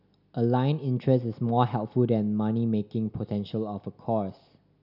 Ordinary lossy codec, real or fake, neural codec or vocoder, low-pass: none; real; none; 5.4 kHz